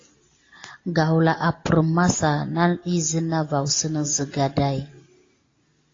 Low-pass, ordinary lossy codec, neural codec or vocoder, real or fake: 7.2 kHz; AAC, 32 kbps; none; real